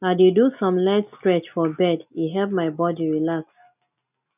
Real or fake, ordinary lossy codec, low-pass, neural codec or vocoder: real; none; 3.6 kHz; none